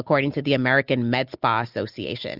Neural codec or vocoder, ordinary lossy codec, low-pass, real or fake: none; Opus, 64 kbps; 5.4 kHz; real